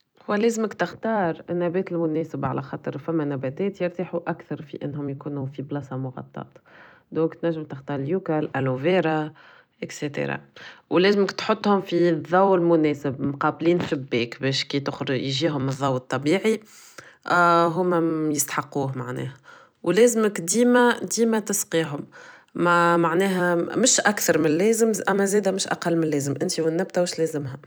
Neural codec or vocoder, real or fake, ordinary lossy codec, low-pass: vocoder, 44.1 kHz, 128 mel bands every 256 samples, BigVGAN v2; fake; none; none